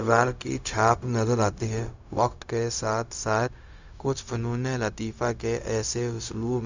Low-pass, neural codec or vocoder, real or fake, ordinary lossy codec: 7.2 kHz; codec, 16 kHz, 0.4 kbps, LongCat-Audio-Codec; fake; Opus, 64 kbps